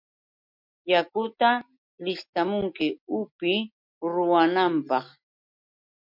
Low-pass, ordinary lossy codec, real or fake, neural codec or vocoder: 5.4 kHz; MP3, 48 kbps; real; none